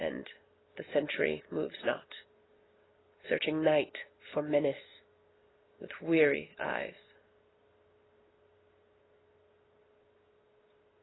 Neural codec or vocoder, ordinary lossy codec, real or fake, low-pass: none; AAC, 16 kbps; real; 7.2 kHz